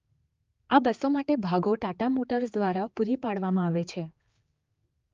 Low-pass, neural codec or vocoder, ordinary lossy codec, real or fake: 7.2 kHz; codec, 16 kHz, 4 kbps, X-Codec, HuBERT features, trained on general audio; Opus, 32 kbps; fake